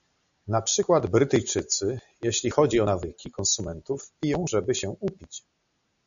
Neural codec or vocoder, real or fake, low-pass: none; real; 7.2 kHz